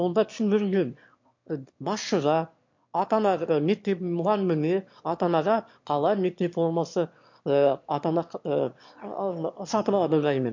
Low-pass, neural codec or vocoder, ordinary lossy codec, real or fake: 7.2 kHz; autoencoder, 22.05 kHz, a latent of 192 numbers a frame, VITS, trained on one speaker; MP3, 48 kbps; fake